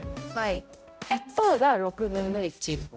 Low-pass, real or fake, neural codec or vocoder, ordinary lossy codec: none; fake; codec, 16 kHz, 0.5 kbps, X-Codec, HuBERT features, trained on balanced general audio; none